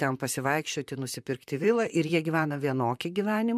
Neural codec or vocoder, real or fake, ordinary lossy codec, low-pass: vocoder, 44.1 kHz, 128 mel bands, Pupu-Vocoder; fake; MP3, 96 kbps; 14.4 kHz